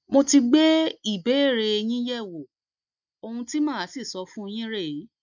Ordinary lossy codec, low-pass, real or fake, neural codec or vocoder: none; 7.2 kHz; real; none